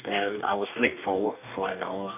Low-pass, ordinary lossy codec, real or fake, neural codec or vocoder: 3.6 kHz; none; fake; codec, 44.1 kHz, 2.6 kbps, DAC